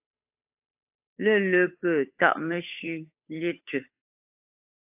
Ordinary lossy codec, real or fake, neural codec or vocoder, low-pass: AAC, 32 kbps; fake; codec, 16 kHz, 8 kbps, FunCodec, trained on Chinese and English, 25 frames a second; 3.6 kHz